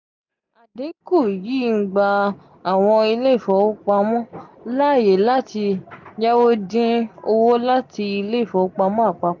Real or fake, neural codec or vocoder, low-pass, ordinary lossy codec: real; none; 7.2 kHz; none